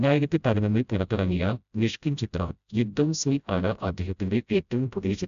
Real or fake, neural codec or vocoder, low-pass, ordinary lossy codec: fake; codec, 16 kHz, 0.5 kbps, FreqCodec, smaller model; 7.2 kHz; none